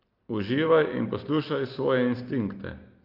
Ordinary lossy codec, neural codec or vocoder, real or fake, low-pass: Opus, 32 kbps; none; real; 5.4 kHz